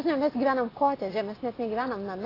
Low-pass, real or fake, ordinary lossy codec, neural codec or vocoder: 5.4 kHz; real; AAC, 24 kbps; none